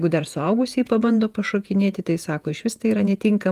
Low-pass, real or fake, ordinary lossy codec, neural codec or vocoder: 14.4 kHz; fake; Opus, 32 kbps; vocoder, 48 kHz, 128 mel bands, Vocos